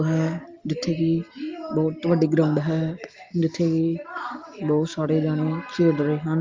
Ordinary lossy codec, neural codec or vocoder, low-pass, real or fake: Opus, 32 kbps; none; 7.2 kHz; real